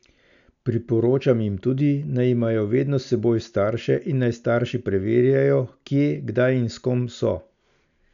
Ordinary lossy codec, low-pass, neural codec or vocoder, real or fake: none; 7.2 kHz; none; real